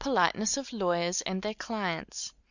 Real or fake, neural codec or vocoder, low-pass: real; none; 7.2 kHz